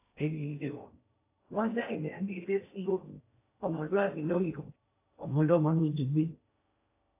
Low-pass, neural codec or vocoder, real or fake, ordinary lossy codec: 3.6 kHz; codec, 16 kHz in and 24 kHz out, 0.6 kbps, FocalCodec, streaming, 2048 codes; fake; AAC, 24 kbps